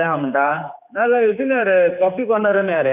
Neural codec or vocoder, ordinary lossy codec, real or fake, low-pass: codec, 16 kHz, 4 kbps, X-Codec, HuBERT features, trained on general audio; none; fake; 3.6 kHz